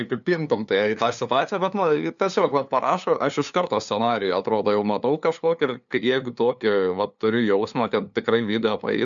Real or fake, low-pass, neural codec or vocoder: fake; 7.2 kHz; codec, 16 kHz, 2 kbps, FunCodec, trained on LibriTTS, 25 frames a second